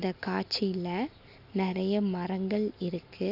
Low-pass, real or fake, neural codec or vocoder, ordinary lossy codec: 5.4 kHz; real; none; none